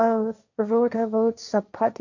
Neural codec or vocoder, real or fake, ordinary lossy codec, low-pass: codec, 16 kHz, 1.1 kbps, Voila-Tokenizer; fake; none; 7.2 kHz